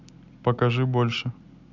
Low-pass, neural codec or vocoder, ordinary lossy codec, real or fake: 7.2 kHz; none; none; real